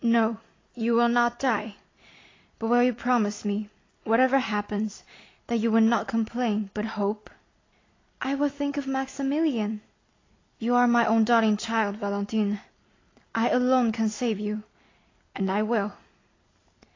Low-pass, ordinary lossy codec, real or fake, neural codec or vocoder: 7.2 kHz; AAC, 32 kbps; real; none